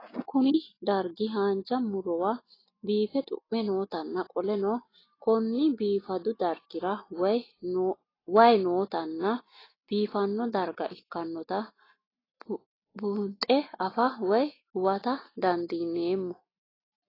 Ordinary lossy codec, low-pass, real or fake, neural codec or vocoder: AAC, 24 kbps; 5.4 kHz; real; none